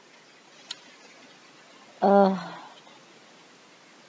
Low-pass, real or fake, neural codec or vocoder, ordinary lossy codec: none; real; none; none